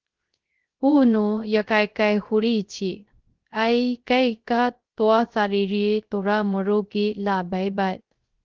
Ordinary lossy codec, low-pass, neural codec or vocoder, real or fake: Opus, 16 kbps; 7.2 kHz; codec, 16 kHz, 0.3 kbps, FocalCodec; fake